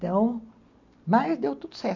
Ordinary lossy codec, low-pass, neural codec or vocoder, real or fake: none; 7.2 kHz; none; real